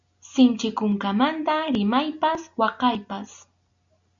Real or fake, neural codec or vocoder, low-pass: real; none; 7.2 kHz